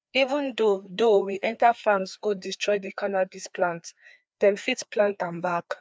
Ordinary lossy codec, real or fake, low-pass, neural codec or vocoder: none; fake; none; codec, 16 kHz, 2 kbps, FreqCodec, larger model